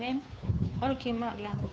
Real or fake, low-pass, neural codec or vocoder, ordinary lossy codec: fake; none; codec, 16 kHz, 2 kbps, FunCodec, trained on Chinese and English, 25 frames a second; none